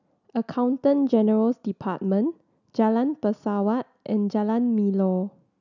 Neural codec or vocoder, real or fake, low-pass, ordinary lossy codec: none; real; 7.2 kHz; none